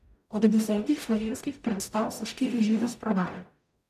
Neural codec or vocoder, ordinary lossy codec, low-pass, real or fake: codec, 44.1 kHz, 0.9 kbps, DAC; none; 14.4 kHz; fake